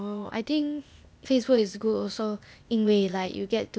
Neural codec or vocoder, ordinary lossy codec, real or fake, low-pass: codec, 16 kHz, 0.8 kbps, ZipCodec; none; fake; none